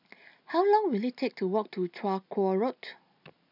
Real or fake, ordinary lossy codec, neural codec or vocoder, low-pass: real; none; none; 5.4 kHz